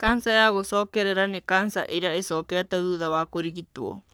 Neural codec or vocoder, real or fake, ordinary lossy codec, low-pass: codec, 44.1 kHz, 3.4 kbps, Pupu-Codec; fake; none; none